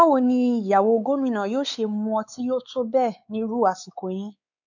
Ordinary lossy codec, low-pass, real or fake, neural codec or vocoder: none; 7.2 kHz; fake; codec, 16 kHz, 4 kbps, X-Codec, WavLM features, trained on Multilingual LibriSpeech